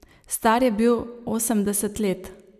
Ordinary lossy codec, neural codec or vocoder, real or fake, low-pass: none; none; real; 14.4 kHz